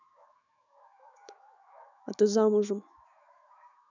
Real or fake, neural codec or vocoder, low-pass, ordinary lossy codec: fake; autoencoder, 48 kHz, 128 numbers a frame, DAC-VAE, trained on Japanese speech; 7.2 kHz; none